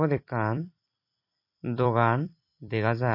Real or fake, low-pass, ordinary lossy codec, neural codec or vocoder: fake; 5.4 kHz; MP3, 32 kbps; vocoder, 44.1 kHz, 128 mel bands every 256 samples, BigVGAN v2